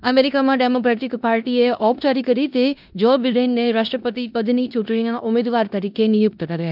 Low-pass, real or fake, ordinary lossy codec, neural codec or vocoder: 5.4 kHz; fake; none; codec, 16 kHz in and 24 kHz out, 0.9 kbps, LongCat-Audio-Codec, four codebook decoder